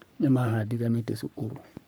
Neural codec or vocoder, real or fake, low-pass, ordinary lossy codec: codec, 44.1 kHz, 3.4 kbps, Pupu-Codec; fake; none; none